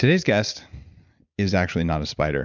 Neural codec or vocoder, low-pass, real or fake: none; 7.2 kHz; real